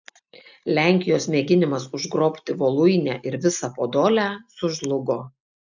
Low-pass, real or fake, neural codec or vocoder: 7.2 kHz; real; none